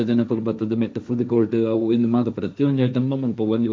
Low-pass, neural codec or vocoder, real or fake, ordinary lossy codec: none; codec, 16 kHz, 1.1 kbps, Voila-Tokenizer; fake; none